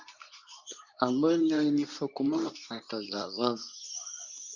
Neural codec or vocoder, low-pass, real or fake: codec, 24 kHz, 0.9 kbps, WavTokenizer, medium speech release version 2; 7.2 kHz; fake